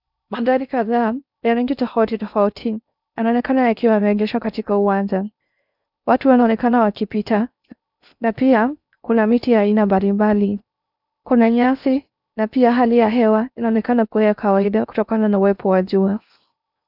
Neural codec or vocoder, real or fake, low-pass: codec, 16 kHz in and 24 kHz out, 0.6 kbps, FocalCodec, streaming, 4096 codes; fake; 5.4 kHz